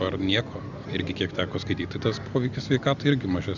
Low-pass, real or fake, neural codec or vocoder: 7.2 kHz; real; none